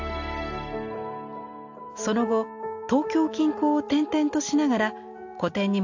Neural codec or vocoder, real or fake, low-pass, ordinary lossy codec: none; real; 7.2 kHz; AAC, 48 kbps